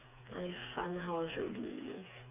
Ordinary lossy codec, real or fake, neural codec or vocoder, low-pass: MP3, 32 kbps; fake; codec, 16 kHz, 4 kbps, FreqCodec, smaller model; 3.6 kHz